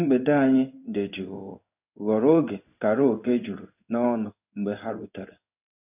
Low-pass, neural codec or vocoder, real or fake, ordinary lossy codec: 3.6 kHz; codec, 16 kHz in and 24 kHz out, 1 kbps, XY-Tokenizer; fake; none